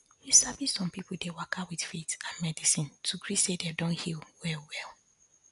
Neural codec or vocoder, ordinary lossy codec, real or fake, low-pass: none; none; real; 10.8 kHz